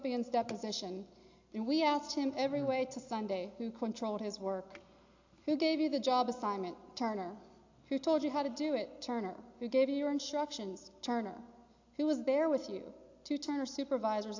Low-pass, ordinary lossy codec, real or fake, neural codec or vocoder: 7.2 kHz; AAC, 48 kbps; real; none